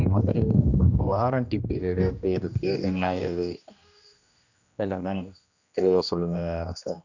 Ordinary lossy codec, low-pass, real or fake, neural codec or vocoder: none; 7.2 kHz; fake; codec, 16 kHz, 1 kbps, X-Codec, HuBERT features, trained on general audio